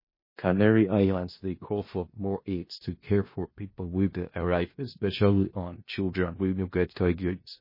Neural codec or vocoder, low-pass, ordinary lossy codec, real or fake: codec, 16 kHz in and 24 kHz out, 0.4 kbps, LongCat-Audio-Codec, four codebook decoder; 5.4 kHz; MP3, 24 kbps; fake